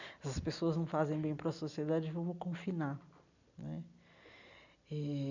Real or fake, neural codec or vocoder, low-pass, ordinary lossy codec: real; none; 7.2 kHz; none